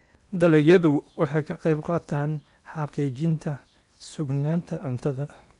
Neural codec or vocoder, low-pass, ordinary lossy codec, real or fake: codec, 16 kHz in and 24 kHz out, 0.8 kbps, FocalCodec, streaming, 65536 codes; 10.8 kHz; none; fake